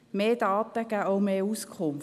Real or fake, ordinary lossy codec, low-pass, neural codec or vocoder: real; none; 14.4 kHz; none